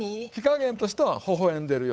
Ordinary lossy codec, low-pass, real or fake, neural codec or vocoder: none; none; fake; codec, 16 kHz, 8 kbps, FunCodec, trained on Chinese and English, 25 frames a second